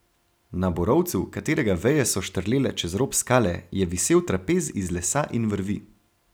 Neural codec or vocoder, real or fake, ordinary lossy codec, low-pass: none; real; none; none